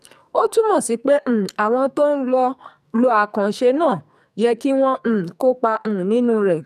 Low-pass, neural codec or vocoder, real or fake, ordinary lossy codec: 14.4 kHz; codec, 44.1 kHz, 2.6 kbps, SNAC; fake; none